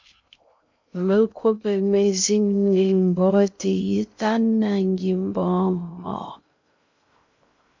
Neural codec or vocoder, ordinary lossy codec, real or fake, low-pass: codec, 16 kHz in and 24 kHz out, 0.6 kbps, FocalCodec, streaming, 2048 codes; MP3, 64 kbps; fake; 7.2 kHz